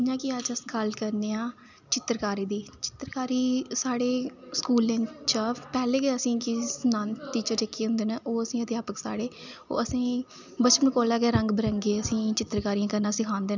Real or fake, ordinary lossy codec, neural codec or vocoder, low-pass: real; none; none; 7.2 kHz